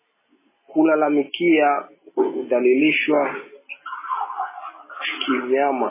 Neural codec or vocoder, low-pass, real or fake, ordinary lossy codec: none; 3.6 kHz; real; MP3, 16 kbps